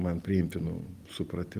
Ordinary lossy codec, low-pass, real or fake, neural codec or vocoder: Opus, 24 kbps; 14.4 kHz; real; none